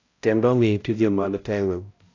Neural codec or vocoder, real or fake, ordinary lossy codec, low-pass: codec, 16 kHz, 0.5 kbps, X-Codec, HuBERT features, trained on balanced general audio; fake; AAC, 32 kbps; 7.2 kHz